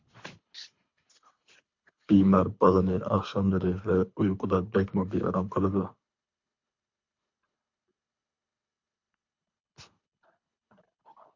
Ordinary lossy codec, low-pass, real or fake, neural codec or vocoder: MP3, 48 kbps; 7.2 kHz; fake; codec, 24 kHz, 3 kbps, HILCodec